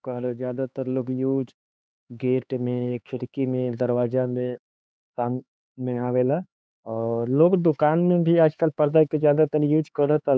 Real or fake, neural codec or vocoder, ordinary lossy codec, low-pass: fake; codec, 16 kHz, 4 kbps, X-Codec, HuBERT features, trained on LibriSpeech; none; none